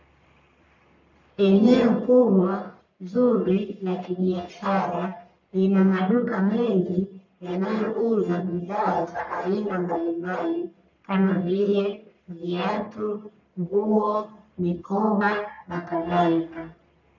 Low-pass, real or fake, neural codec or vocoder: 7.2 kHz; fake; codec, 44.1 kHz, 1.7 kbps, Pupu-Codec